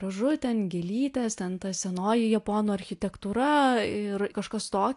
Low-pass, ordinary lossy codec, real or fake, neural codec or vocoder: 10.8 kHz; AAC, 64 kbps; real; none